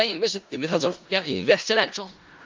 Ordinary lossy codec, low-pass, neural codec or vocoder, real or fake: Opus, 32 kbps; 7.2 kHz; codec, 16 kHz in and 24 kHz out, 0.4 kbps, LongCat-Audio-Codec, four codebook decoder; fake